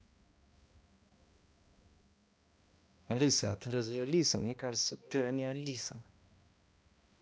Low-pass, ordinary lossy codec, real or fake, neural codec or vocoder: none; none; fake; codec, 16 kHz, 1 kbps, X-Codec, HuBERT features, trained on balanced general audio